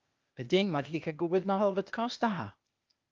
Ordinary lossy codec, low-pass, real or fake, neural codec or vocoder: Opus, 24 kbps; 7.2 kHz; fake; codec, 16 kHz, 0.8 kbps, ZipCodec